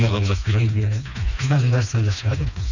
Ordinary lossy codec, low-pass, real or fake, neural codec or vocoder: none; 7.2 kHz; fake; codec, 16 kHz, 2 kbps, FreqCodec, smaller model